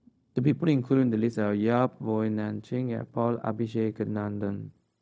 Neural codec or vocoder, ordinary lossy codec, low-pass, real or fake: codec, 16 kHz, 0.4 kbps, LongCat-Audio-Codec; none; none; fake